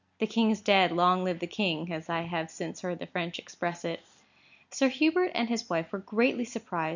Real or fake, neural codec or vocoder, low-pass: real; none; 7.2 kHz